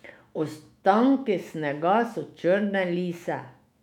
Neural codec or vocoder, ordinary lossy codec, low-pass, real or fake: autoencoder, 48 kHz, 128 numbers a frame, DAC-VAE, trained on Japanese speech; none; 19.8 kHz; fake